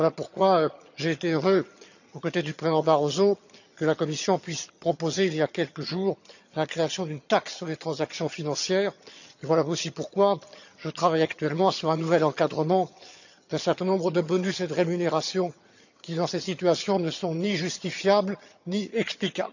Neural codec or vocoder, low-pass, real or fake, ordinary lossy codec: vocoder, 22.05 kHz, 80 mel bands, HiFi-GAN; 7.2 kHz; fake; none